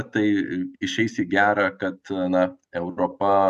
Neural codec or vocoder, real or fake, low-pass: none; real; 14.4 kHz